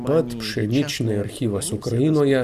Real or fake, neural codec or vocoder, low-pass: fake; vocoder, 44.1 kHz, 128 mel bands every 256 samples, BigVGAN v2; 14.4 kHz